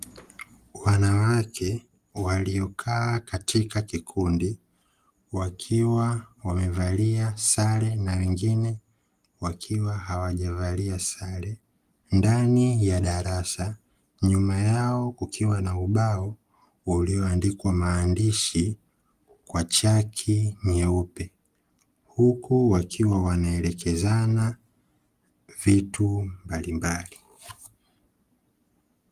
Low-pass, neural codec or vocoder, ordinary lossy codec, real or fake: 14.4 kHz; none; Opus, 24 kbps; real